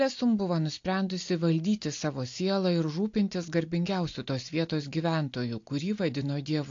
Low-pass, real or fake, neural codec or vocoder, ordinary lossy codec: 7.2 kHz; real; none; AAC, 48 kbps